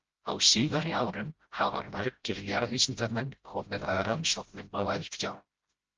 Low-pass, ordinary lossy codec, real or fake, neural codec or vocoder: 7.2 kHz; Opus, 24 kbps; fake; codec, 16 kHz, 0.5 kbps, FreqCodec, smaller model